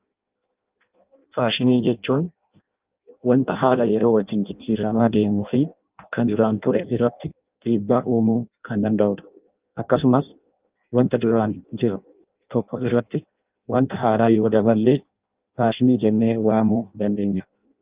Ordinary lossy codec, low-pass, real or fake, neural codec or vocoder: Opus, 24 kbps; 3.6 kHz; fake; codec, 16 kHz in and 24 kHz out, 0.6 kbps, FireRedTTS-2 codec